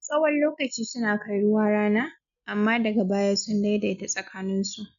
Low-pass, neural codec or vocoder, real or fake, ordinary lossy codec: 7.2 kHz; none; real; none